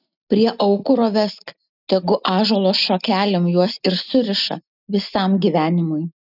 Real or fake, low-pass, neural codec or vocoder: real; 5.4 kHz; none